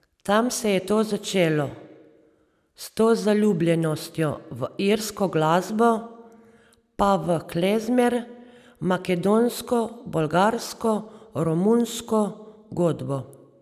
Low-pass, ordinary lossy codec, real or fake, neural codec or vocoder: 14.4 kHz; none; real; none